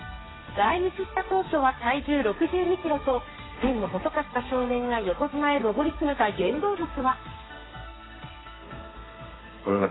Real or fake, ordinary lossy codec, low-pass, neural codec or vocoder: fake; AAC, 16 kbps; 7.2 kHz; codec, 32 kHz, 1.9 kbps, SNAC